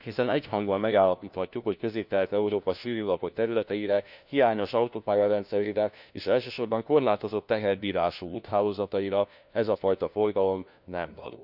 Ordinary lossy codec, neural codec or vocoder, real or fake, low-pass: none; codec, 16 kHz, 1 kbps, FunCodec, trained on LibriTTS, 50 frames a second; fake; 5.4 kHz